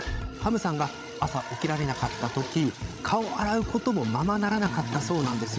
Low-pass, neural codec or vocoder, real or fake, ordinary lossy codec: none; codec, 16 kHz, 16 kbps, FreqCodec, larger model; fake; none